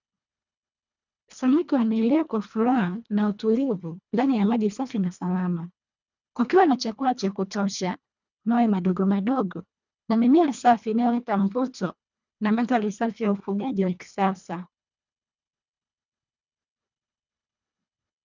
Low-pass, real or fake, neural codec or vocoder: 7.2 kHz; fake; codec, 24 kHz, 1.5 kbps, HILCodec